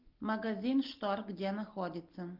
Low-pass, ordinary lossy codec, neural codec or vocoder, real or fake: 5.4 kHz; Opus, 24 kbps; none; real